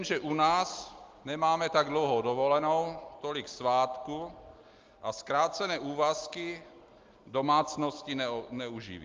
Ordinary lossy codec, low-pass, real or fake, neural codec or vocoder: Opus, 32 kbps; 7.2 kHz; real; none